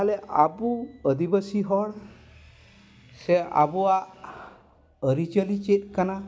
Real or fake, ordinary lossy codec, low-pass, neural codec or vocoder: real; none; none; none